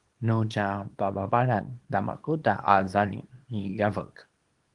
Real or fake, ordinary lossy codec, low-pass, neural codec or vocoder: fake; Opus, 24 kbps; 10.8 kHz; codec, 24 kHz, 0.9 kbps, WavTokenizer, small release